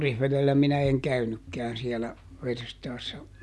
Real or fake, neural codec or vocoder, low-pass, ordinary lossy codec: real; none; none; none